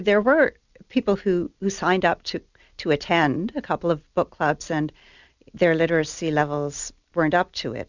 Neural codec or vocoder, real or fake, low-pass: none; real; 7.2 kHz